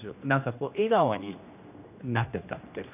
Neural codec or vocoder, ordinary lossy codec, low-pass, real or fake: codec, 16 kHz, 1 kbps, X-Codec, HuBERT features, trained on general audio; none; 3.6 kHz; fake